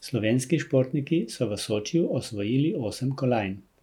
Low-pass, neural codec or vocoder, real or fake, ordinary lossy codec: 19.8 kHz; none; real; none